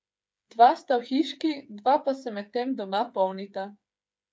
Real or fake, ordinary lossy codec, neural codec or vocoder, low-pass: fake; none; codec, 16 kHz, 8 kbps, FreqCodec, smaller model; none